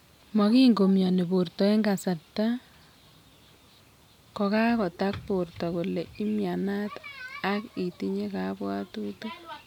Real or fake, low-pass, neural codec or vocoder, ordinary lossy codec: real; 19.8 kHz; none; none